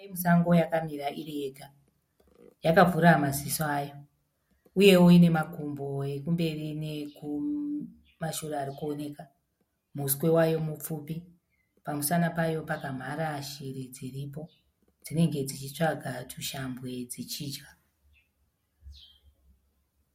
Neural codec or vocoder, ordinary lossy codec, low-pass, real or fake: none; MP3, 64 kbps; 19.8 kHz; real